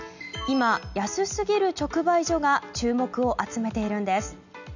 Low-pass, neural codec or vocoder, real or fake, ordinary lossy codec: 7.2 kHz; none; real; none